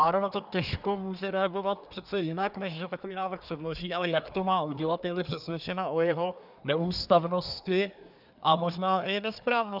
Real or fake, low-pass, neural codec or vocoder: fake; 5.4 kHz; codec, 24 kHz, 1 kbps, SNAC